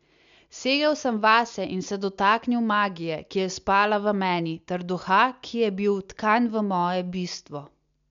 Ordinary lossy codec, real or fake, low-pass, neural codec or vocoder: MP3, 64 kbps; real; 7.2 kHz; none